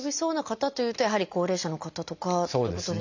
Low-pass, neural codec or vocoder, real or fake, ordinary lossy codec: 7.2 kHz; none; real; none